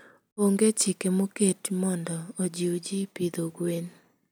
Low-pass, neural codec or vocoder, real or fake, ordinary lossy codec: none; none; real; none